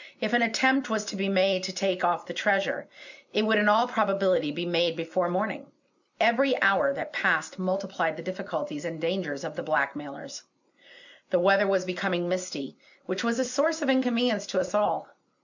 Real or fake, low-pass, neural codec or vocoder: real; 7.2 kHz; none